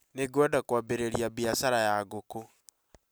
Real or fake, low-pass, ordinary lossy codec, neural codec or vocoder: real; none; none; none